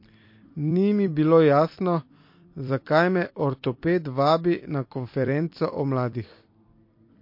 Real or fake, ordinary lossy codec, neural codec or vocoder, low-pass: fake; MP3, 32 kbps; vocoder, 44.1 kHz, 128 mel bands every 256 samples, BigVGAN v2; 5.4 kHz